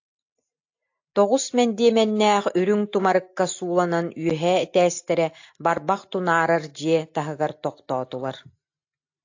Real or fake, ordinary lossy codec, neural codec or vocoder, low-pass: real; AAC, 48 kbps; none; 7.2 kHz